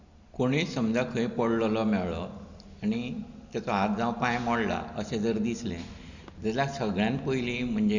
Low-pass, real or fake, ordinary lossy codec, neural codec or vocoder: 7.2 kHz; real; Opus, 64 kbps; none